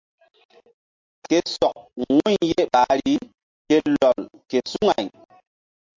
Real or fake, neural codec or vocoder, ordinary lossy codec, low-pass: real; none; MP3, 48 kbps; 7.2 kHz